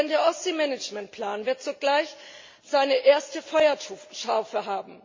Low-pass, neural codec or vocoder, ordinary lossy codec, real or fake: 7.2 kHz; none; MP3, 32 kbps; real